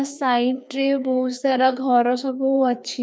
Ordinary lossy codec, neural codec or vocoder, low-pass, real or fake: none; codec, 16 kHz, 2 kbps, FreqCodec, larger model; none; fake